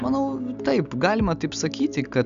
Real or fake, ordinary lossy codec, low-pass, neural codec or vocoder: real; Opus, 64 kbps; 7.2 kHz; none